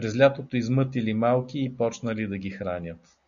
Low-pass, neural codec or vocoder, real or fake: 7.2 kHz; none; real